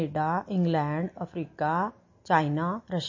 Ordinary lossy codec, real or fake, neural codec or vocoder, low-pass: MP3, 32 kbps; real; none; 7.2 kHz